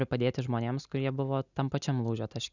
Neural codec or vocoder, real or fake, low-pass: none; real; 7.2 kHz